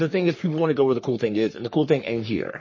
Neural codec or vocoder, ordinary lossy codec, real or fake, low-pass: codec, 44.1 kHz, 3.4 kbps, Pupu-Codec; MP3, 32 kbps; fake; 7.2 kHz